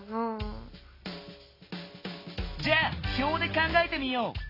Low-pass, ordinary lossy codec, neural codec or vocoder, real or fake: 5.4 kHz; AAC, 24 kbps; none; real